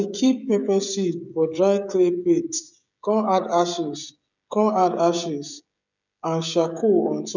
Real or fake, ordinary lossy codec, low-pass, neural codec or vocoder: fake; none; 7.2 kHz; codec, 16 kHz, 16 kbps, FreqCodec, smaller model